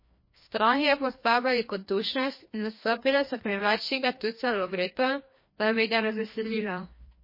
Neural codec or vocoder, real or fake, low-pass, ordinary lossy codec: codec, 16 kHz, 1 kbps, FreqCodec, larger model; fake; 5.4 kHz; MP3, 24 kbps